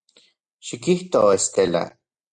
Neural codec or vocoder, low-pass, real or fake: none; 9.9 kHz; real